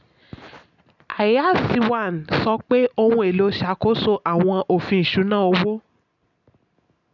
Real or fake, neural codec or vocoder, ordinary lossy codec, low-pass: real; none; none; 7.2 kHz